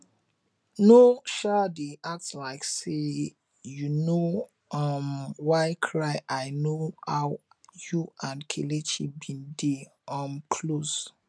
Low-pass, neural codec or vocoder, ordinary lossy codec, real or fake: none; none; none; real